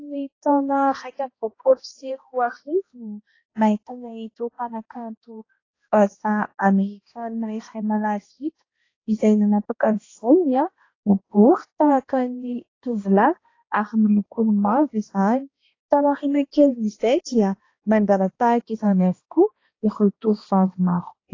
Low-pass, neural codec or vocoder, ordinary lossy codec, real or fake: 7.2 kHz; codec, 16 kHz, 1 kbps, X-Codec, HuBERT features, trained on balanced general audio; AAC, 32 kbps; fake